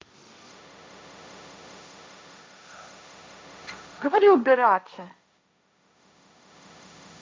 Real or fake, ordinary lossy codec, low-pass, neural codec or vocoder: fake; none; none; codec, 16 kHz, 1.1 kbps, Voila-Tokenizer